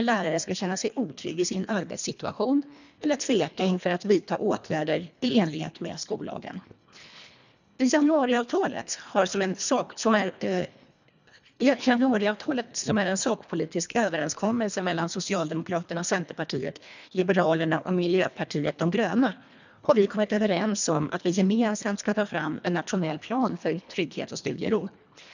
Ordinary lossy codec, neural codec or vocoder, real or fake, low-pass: none; codec, 24 kHz, 1.5 kbps, HILCodec; fake; 7.2 kHz